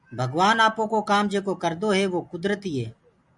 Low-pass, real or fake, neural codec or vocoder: 10.8 kHz; real; none